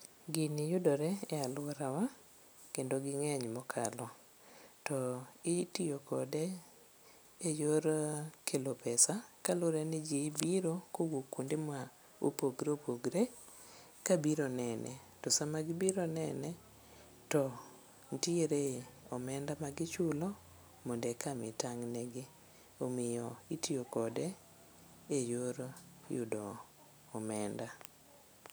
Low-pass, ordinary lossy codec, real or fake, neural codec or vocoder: none; none; real; none